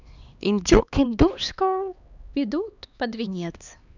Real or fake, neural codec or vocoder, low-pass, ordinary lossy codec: fake; codec, 16 kHz, 2 kbps, X-Codec, HuBERT features, trained on LibriSpeech; 7.2 kHz; none